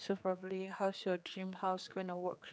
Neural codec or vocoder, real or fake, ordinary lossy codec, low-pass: codec, 16 kHz, 4 kbps, X-Codec, HuBERT features, trained on general audio; fake; none; none